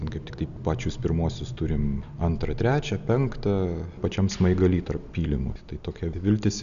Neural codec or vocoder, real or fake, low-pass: none; real; 7.2 kHz